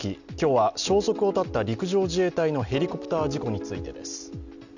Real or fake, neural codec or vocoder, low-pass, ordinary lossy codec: real; none; 7.2 kHz; none